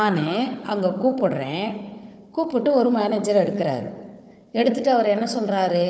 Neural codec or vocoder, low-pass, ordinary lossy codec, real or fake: codec, 16 kHz, 4 kbps, FunCodec, trained on Chinese and English, 50 frames a second; none; none; fake